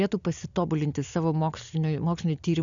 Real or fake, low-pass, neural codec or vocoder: real; 7.2 kHz; none